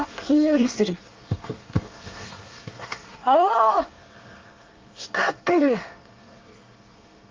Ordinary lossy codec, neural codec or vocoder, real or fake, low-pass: Opus, 24 kbps; codec, 24 kHz, 1 kbps, SNAC; fake; 7.2 kHz